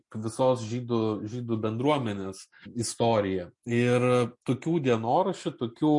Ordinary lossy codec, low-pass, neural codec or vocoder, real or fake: MP3, 48 kbps; 10.8 kHz; none; real